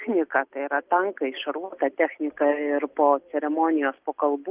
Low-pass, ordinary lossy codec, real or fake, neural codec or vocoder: 3.6 kHz; Opus, 32 kbps; real; none